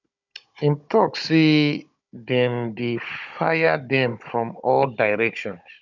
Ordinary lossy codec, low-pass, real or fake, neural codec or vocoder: none; 7.2 kHz; fake; codec, 16 kHz, 16 kbps, FunCodec, trained on Chinese and English, 50 frames a second